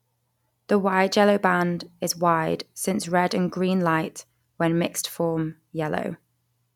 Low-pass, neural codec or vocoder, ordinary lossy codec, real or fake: 19.8 kHz; none; none; real